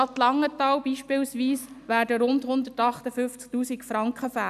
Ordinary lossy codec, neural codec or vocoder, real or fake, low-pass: none; none; real; 14.4 kHz